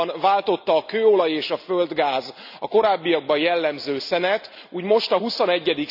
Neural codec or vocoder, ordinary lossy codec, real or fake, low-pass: none; none; real; 5.4 kHz